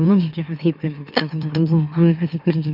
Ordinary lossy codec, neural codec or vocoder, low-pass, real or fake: none; autoencoder, 44.1 kHz, a latent of 192 numbers a frame, MeloTTS; 5.4 kHz; fake